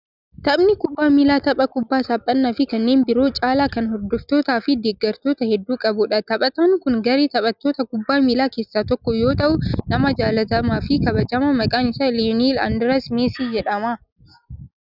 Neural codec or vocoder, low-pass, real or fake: none; 5.4 kHz; real